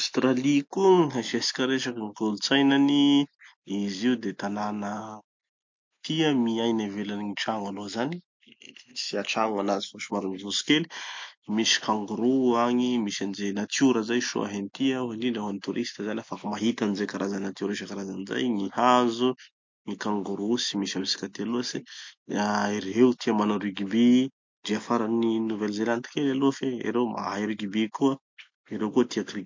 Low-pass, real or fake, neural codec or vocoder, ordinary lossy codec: 7.2 kHz; real; none; MP3, 48 kbps